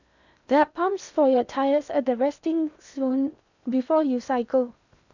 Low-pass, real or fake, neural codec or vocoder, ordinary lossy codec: 7.2 kHz; fake; codec, 16 kHz in and 24 kHz out, 0.8 kbps, FocalCodec, streaming, 65536 codes; none